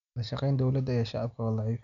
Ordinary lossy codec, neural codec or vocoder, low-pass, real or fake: none; none; 7.2 kHz; real